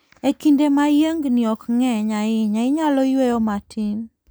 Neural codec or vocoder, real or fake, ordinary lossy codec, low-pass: none; real; none; none